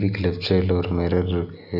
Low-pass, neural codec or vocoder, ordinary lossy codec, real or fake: 5.4 kHz; none; none; real